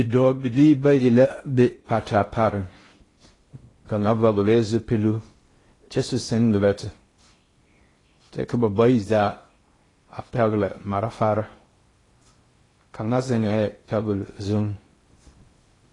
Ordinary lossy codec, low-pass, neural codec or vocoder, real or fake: AAC, 32 kbps; 10.8 kHz; codec, 16 kHz in and 24 kHz out, 0.6 kbps, FocalCodec, streaming, 4096 codes; fake